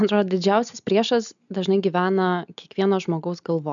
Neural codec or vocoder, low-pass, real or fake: none; 7.2 kHz; real